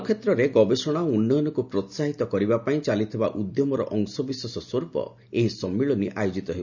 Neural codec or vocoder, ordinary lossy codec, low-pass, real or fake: none; none; 7.2 kHz; real